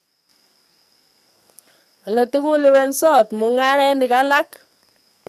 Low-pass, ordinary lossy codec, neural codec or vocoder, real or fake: 14.4 kHz; none; codec, 44.1 kHz, 2.6 kbps, SNAC; fake